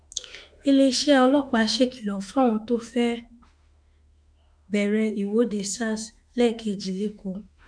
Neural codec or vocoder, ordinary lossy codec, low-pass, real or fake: autoencoder, 48 kHz, 32 numbers a frame, DAC-VAE, trained on Japanese speech; none; 9.9 kHz; fake